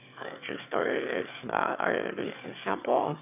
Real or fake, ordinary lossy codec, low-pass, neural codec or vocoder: fake; none; 3.6 kHz; autoencoder, 22.05 kHz, a latent of 192 numbers a frame, VITS, trained on one speaker